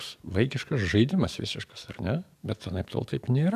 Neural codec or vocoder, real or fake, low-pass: codec, 44.1 kHz, 7.8 kbps, DAC; fake; 14.4 kHz